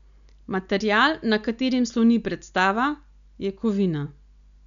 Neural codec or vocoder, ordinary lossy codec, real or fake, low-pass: none; none; real; 7.2 kHz